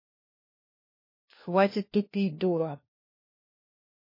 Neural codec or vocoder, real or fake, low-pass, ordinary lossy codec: codec, 16 kHz, 1 kbps, FunCodec, trained on LibriTTS, 50 frames a second; fake; 5.4 kHz; MP3, 24 kbps